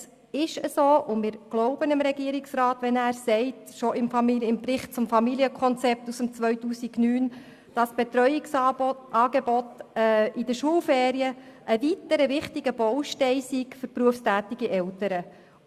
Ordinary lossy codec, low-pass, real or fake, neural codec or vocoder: Opus, 64 kbps; 14.4 kHz; real; none